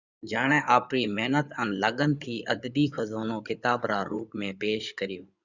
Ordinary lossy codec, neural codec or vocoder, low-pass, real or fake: Opus, 64 kbps; codec, 16 kHz in and 24 kHz out, 2.2 kbps, FireRedTTS-2 codec; 7.2 kHz; fake